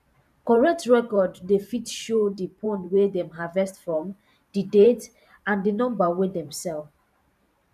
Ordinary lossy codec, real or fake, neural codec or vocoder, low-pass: none; fake; vocoder, 44.1 kHz, 128 mel bands every 512 samples, BigVGAN v2; 14.4 kHz